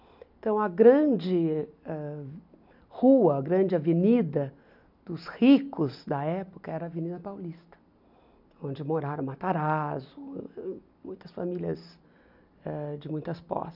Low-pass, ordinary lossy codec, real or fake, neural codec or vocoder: 5.4 kHz; none; real; none